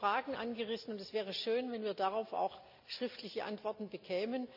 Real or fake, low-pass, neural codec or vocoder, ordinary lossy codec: real; 5.4 kHz; none; none